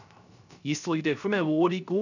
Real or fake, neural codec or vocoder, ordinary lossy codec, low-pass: fake; codec, 16 kHz, 0.3 kbps, FocalCodec; none; 7.2 kHz